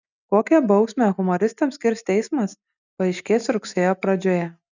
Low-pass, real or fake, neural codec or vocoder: 7.2 kHz; real; none